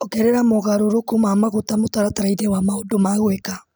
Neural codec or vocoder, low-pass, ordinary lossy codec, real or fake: none; none; none; real